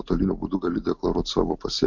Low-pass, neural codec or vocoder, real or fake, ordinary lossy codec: 7.2 kHz; none; real; MP3, 48 kbps